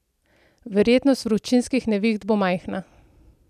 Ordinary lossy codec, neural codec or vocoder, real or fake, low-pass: none; none; real; 14.4 kHz